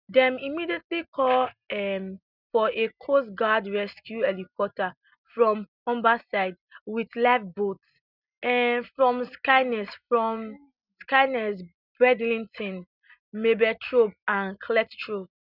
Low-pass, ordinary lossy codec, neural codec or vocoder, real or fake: 5.4 kHz; none; none; real